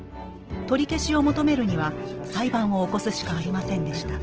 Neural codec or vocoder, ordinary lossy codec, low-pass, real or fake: none; Opus, 16 kbps; 7.2 kHz; real